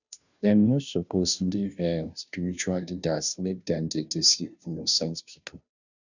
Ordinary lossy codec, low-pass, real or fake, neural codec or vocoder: none; 7.2 kHz; fake; codec, 16 kHz, 0.5 kbps, FunCodec, trained on Chinese and English, 25 frames a second